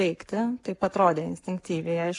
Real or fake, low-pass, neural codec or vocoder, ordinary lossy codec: fake; 10.8 kHz; codec, 44.1 kHz, 7.8 kbps, Pupu-Codec; AAC, 64 kbps